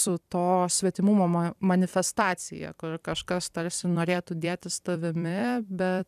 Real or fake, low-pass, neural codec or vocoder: fake; 14.4 kHz; vocoder, 44.1 kHz, 128 mel bands every 256 samples, BigVGAN v2